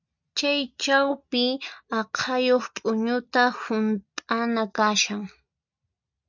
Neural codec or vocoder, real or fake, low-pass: none; real; 7.2 kHz